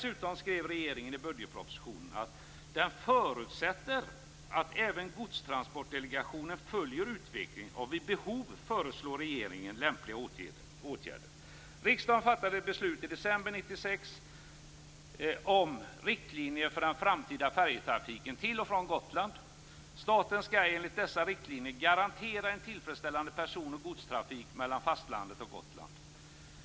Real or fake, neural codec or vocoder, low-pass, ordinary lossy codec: real; none; none; none